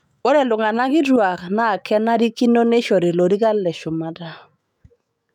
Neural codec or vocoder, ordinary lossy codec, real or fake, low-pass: autoencoder, 48 kHz, 128 numbers a frame, DAC-VAE, trained on Japanese speech; none; fake; 19.8 kHz